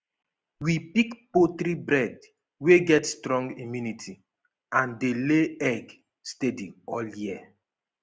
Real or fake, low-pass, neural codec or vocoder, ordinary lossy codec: real; none; none; none